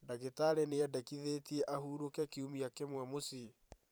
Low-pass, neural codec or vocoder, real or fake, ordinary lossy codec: none; vocoder, 44.1 kHz, 128 mel bands every 512 samples, BigVGAN v2; fake; none